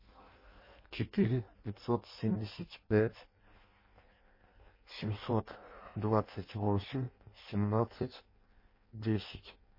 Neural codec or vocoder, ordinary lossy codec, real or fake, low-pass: codec, 16 kHz in and 24 kHz out, 0.6 kbps, FireRedTTS-2 codec; MP3, 24 kbps; fake; 5.4 kHz